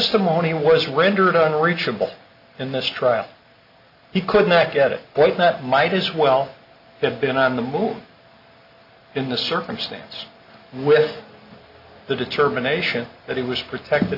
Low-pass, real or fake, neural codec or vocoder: 5.4 kHz; real; none